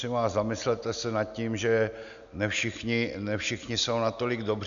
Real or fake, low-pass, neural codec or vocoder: real; 7.2 kHz; none